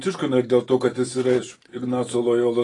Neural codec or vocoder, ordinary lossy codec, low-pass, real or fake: none; AAC, 32 kbps; 10.8 kHz; real